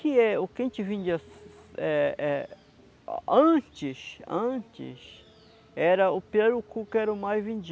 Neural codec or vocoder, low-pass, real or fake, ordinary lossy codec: none; none; real; none